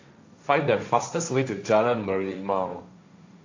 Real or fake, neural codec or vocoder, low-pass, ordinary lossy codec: fake; codec, 16 kHz, 1.1 kbps, Voila-Tokenizer; 7.2 kHz; AAC, 48 kbps